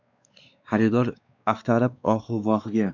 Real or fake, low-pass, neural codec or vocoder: fake; 7.2 kHz; codec, 16 kHz, 2 kbps, X-Codec, WavLM features, trained on Multilingual LibriSpeech